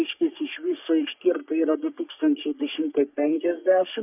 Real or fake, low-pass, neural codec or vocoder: fake; 3.6 kHz; codec, 44.1 kHz, 3.4 kbps, Pupu-Codec